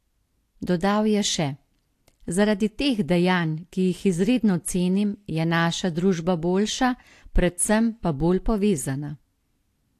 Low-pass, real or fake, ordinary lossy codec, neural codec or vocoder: 14.4 kHz; real; AAC, 64 kbps; none